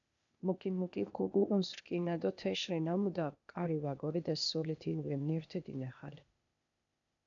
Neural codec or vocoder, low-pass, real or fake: codec, 16 kHz, 0.8 kbps, ZipCodec; 7.2 kHz; fake